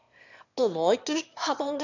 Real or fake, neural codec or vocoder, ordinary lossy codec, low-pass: fake; autoencoder, 22.05 kHz, a latent of 192 numbers a frame, VITS, trained on one speaker; none; 7.2 kHz